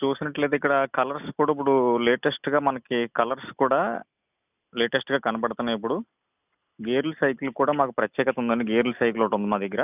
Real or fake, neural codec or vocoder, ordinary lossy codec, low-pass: real; none; none; 3.6 kHz